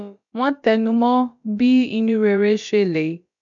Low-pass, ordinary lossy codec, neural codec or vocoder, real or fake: 7.2 kHz; none; codec, 16 kHz, about 1 kbps, DyCAST, with the encoder's durations; fake